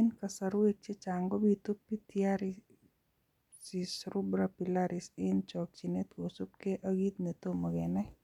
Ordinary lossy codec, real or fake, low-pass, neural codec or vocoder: none; real; 19.8 kHz; none